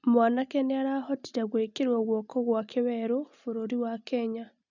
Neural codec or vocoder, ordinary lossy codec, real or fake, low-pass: none; none; real; 7.2 kHz